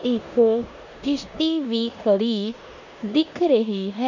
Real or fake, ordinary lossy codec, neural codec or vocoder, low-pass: fake; none; codec, 16 kHz in and 24 kHz out, 0.9 kbps, LongCat-Audio-Codec, four codebook decoder; 7.2 kHz